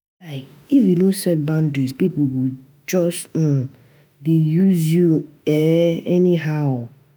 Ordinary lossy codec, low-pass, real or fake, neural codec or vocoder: none; none; fake; autoencoder, 48 kHz, 32 numbers a frame, DAC-VAE, trained on Japanese speech